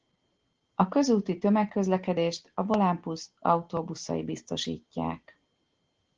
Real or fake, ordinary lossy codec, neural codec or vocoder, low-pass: real; Opus, 16 kbps; none; 7.2 kHz